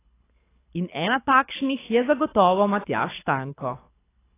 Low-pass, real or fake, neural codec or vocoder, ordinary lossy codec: 3.6 kHz; fake; codec, 24 kHz, 6 kbps, HILCodec; AAC, 16 kbps